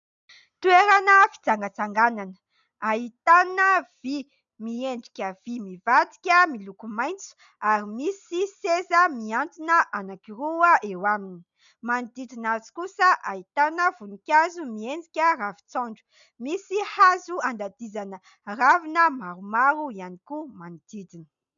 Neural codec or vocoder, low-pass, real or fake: none; 7.2 kHz; real